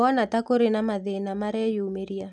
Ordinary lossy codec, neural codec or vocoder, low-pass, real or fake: none; none; none; real